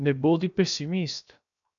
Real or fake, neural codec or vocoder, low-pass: fake; codec, 16 kHz, 0.7 kbps, FocalCodec; 7.2 kHz